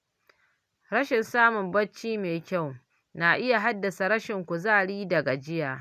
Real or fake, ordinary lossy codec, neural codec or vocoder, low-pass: real; none; none; 14.4 kHz